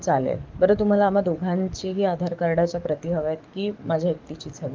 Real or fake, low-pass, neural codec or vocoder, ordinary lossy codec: fake; 7.2 kHz; codec, 44.1 kHz, 7.8 kbps, DAC; Opus, 32 kbps